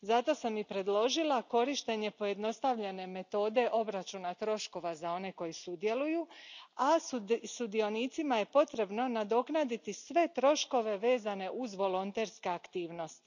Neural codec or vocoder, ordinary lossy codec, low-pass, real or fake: none; none; 7.2 kHz; real